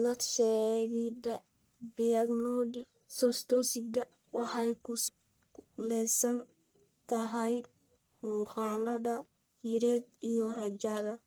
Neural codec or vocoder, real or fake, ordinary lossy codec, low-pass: codec, 44.1 kHz, 1.7 kbps, Pupu-Codec; fake; none; none